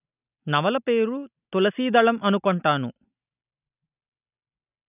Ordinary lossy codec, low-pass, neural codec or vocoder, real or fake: none; 3.6 kHz; none; real